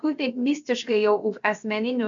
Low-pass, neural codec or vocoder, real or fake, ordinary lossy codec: 7.2 kHz; codec, 16 kHz, about 1 kbps, DyCAST, with the encoder's durations; fake; MP3, 64 kbps